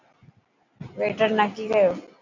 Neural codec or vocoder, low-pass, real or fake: none; 7.2 kHz; real